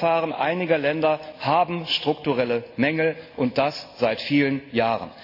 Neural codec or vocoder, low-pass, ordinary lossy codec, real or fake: none; 5.4 kHz; AAC, 32 kbps; real